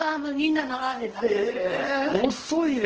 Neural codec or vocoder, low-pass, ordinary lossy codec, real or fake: codec, 24 kHz, 0.9 kbps, WavTokenizer, small release; 7.2 kHz; Opus, 16 kbps; fake